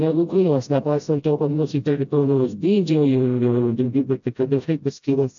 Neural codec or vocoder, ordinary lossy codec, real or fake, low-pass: codec, 16 kHz, 0.5 kbps, FreqCodec, smaller model; AAC, 64 kbps; fake; 7.2 kHz